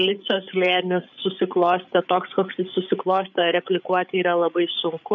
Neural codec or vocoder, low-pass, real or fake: codec, 16 kHz, 8 kbps, FreqCodec, larger model; 7.2 kHz; fake